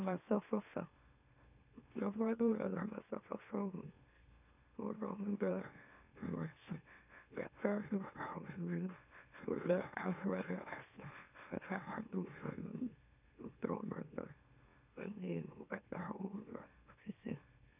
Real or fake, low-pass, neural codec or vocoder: fake; 3.6 kHz; autoencoder, 44.1 kHz, a latent of 192 numbers a frame, MeloTTS